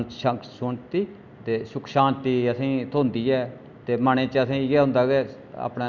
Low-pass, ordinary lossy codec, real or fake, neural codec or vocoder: 7.2 kHz; none; real; none